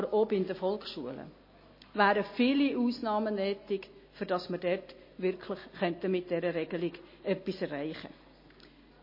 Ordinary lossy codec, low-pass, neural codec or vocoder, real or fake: MP3, 24 kbps; 5.4 kHz; none; real